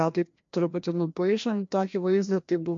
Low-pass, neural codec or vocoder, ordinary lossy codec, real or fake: 7.2 kHz; codec, 16 kHz, 1 kbps, FreqCodec, larger model; MP3, 48 kbps; fake